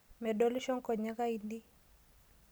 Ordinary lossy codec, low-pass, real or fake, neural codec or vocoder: none; none; real; none